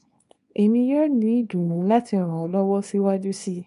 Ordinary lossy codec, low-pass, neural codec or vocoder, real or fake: MP3, 64 kbps; 10.8 kHz; codec, 24 kHz, 0.9 kbps, WavTokenizer, small release; fake